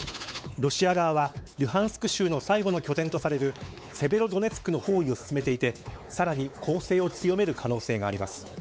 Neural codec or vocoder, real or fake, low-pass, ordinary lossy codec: codec, 16 kHz, 4 kbps, X-Codec, WavLM features, trained on Multilingual LibriSpeech; fake; none; none